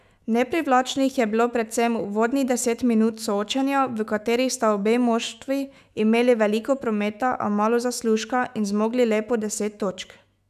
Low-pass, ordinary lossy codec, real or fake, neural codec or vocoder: 14.4 kHz; none; fake; autoencoder, 48 kHz, 128 numbers a frame, DAC-VAE, trained on Japanese speech